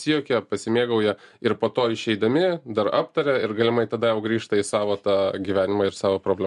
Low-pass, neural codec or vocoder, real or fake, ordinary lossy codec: 10.8 kHz; none; real; MP3, 64 kbps